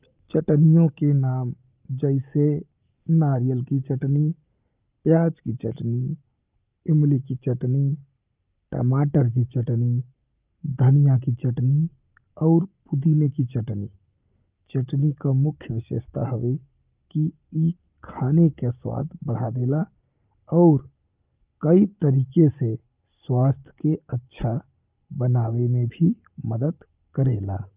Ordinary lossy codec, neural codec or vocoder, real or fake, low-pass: Opus, 24 kbps; none; real; 3.6 kHz